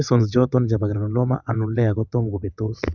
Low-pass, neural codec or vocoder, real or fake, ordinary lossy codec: 7.2 kHz; vocoder, 22.05 kHz, 80 mel bands, WaveNeXt; fake; none